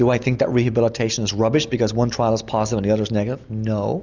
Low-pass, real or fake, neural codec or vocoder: 7.2 kHz; real; none